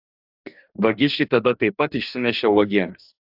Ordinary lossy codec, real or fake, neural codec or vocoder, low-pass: AAC, 48 kbps; fake; codec, 44.1 kHz, 2.6 kbps, DAC; 5.4 kHz